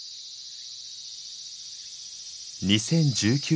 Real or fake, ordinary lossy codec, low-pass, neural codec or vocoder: real; none; none; none